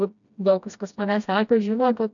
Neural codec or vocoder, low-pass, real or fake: codec, 16 kHz, 1 kbps, FreqCodec, smaller model; 7.2 kHz; fake